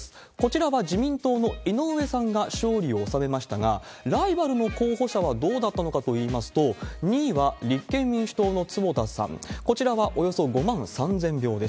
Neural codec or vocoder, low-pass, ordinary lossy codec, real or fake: none; none; none; real